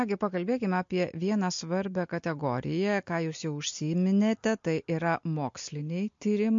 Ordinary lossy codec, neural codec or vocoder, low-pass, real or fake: MP3, 48 kbps; none; 7.2 kHz; real